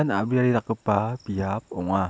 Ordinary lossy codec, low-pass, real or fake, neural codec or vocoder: none; none; real; none